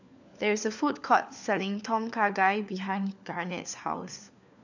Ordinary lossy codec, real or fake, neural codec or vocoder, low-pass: none; fake; codec, 16 kHz, 4 kbps, FunCodec, trained on LibriTTS, 50 frames a second; 7.2 kHz